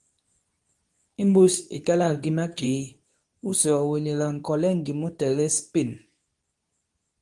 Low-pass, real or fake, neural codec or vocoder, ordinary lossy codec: 10.8 kHz; fake; codec, 24 kHz, 0.9 kbps, WavTokenizer, medium speech release version 2; Opus, 24 kbps